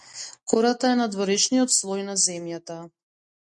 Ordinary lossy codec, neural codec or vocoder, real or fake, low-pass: MP3, 48 kbps; none; real; 10.8 kHz